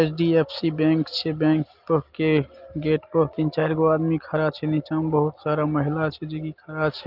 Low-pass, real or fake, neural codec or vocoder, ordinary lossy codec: 5.4 kHz; real; none; Opus, 32 kbps